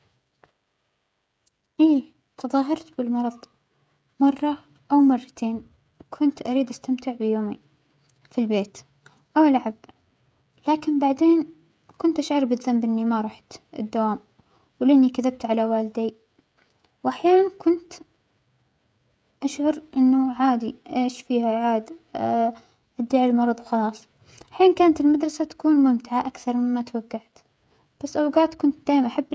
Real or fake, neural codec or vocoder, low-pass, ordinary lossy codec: fake; codec, 16 kHz, 16 kbps, FreqCodec, smaller model; none; none